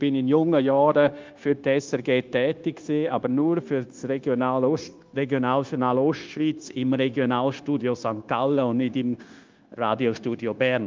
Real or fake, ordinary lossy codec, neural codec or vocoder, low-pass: fake; Opus, 24 kbps; codec, 16 kHz, 0.9 kbps, LongCat-Audio-Codec; 7.2 kHz